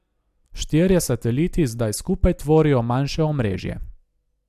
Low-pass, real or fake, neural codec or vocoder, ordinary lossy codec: 14.4 kHz; real; none; AAC, 96 kbps